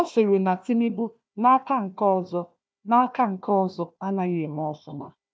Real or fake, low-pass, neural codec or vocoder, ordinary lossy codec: fake; none; codec, 16 kHz, 1 kbps, FunCodec, trained on Chinese and English, 50 frames a second; none